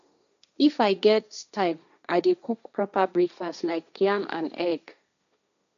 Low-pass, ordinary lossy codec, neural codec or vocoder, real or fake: 7.2 kHz; none; codec, 16 kHz, 1.1 kbps, Voila-Tokenizer; fake